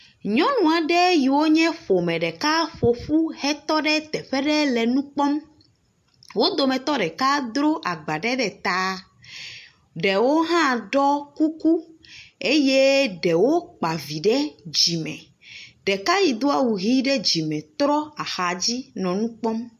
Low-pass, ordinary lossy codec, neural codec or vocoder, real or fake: 14.4 kHz; MP3, 64 kbps; none; real